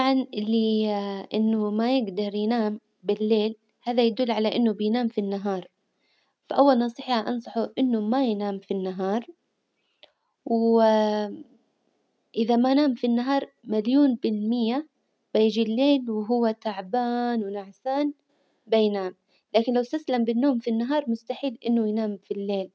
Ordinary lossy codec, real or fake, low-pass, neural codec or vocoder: none; real; none; none